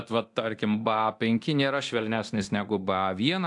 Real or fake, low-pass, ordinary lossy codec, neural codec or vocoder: fake; 10.8 kHz; AAC, 64 kbps; codec, 24 kHz, 0.9 kbps, DualCodec